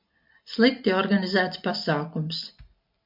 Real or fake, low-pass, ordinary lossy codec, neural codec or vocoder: real; 5.4 kHz; MP3, 48 kbps; none